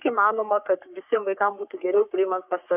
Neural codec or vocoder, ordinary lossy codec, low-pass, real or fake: codec, 44.1 kHz, 3.4 kbps, Pupu-Codec; AAC, 32 kbps; 3.6 kHz; fake